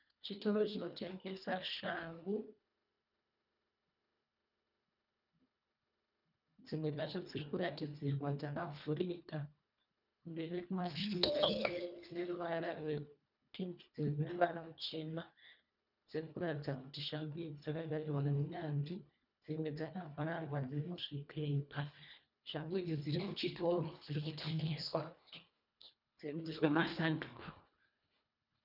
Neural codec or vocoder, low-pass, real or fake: codec, 24 kHz, 1.5 kbps, HILCodec; 5.4 kHz; fake